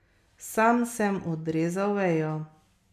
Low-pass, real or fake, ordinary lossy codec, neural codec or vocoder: 14.4 kHz; real; none; none